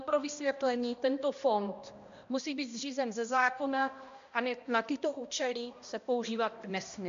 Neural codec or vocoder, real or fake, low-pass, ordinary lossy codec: codec, 16 kHz, 1 kbps, X-Codec, HuBERT features, trained on balanced general audio; fake; 7.2 kHz; MP3, 64 kbps